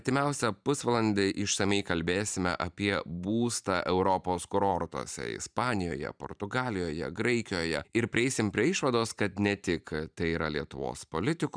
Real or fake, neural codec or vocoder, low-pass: fake; vocoder, 44.1 kHz, 128 mel bands every 512 samples, BigVGAN v2; 9.9 kHz